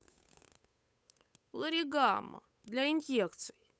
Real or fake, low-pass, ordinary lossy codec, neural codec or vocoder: fake; none; none; codec, 16 kHz, 8 kbps, FunCodec, trained on Chinese and English, 25 frames a second